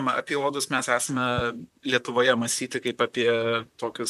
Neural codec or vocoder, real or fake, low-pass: vocoder, 44.1 kHz, 128 mel bands, Pupu-Vocoder; fake; 14.4 kHz